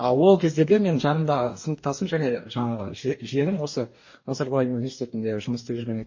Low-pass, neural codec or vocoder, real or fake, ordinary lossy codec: 7.2 kHz; codec, 44.1 kHz, 2.6 kbps, DAC; fake; MP3, 32 kbps